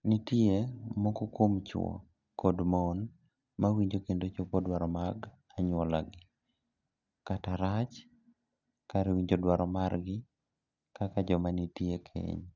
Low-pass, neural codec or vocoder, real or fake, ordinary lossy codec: 7.2 kHz; none; real; none